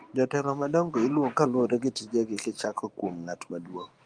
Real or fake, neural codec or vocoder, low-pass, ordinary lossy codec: real; none; 9.9 kHz; Opus, 32 kbps